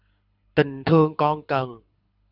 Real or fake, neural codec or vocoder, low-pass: fake; codec, 24 kHz, 6 kbps, HILCodec; 5.4 kHz